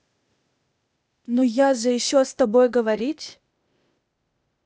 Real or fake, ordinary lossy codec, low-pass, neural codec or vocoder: fake; none; none; codec, 16 kHz, 0.8 kbps, ZipCodec